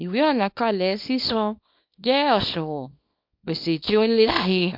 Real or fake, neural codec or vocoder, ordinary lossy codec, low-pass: fake; codec, 24 kHz, 0.9 kbps, WavTokenizer, small release; MP3, 48 kbps; 5.4 kHz